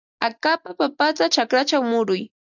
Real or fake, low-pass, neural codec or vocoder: real; 7.2 kHz; none